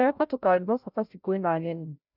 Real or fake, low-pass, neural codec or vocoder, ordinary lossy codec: fake; 5.4 kHz; codec, 16 kHz, 0.5 kbps, FreqCodec, larger model; none